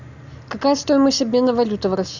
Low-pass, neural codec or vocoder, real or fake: 7.2 kHz; none; real